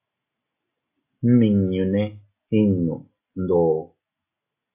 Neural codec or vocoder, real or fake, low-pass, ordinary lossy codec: none; real; 3.6 kHz; AAC, 32 kbps